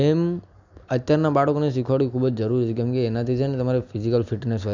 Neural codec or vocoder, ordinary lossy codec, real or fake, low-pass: none; none; real; 7.2 kHz